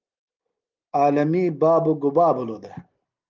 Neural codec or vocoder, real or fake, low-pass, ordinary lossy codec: none; real; 7.2 kHz; Opus, 32 kbps